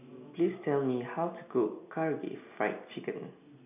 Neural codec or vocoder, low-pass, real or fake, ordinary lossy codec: none; 3.6 kHz; real; none